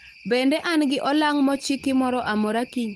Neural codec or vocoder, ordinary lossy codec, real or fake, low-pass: none; Opus, 24 kbps; real; 19.8 kHz